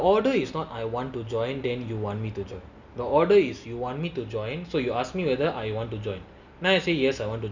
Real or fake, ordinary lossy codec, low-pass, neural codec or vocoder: real; none; 7.2 kHz; none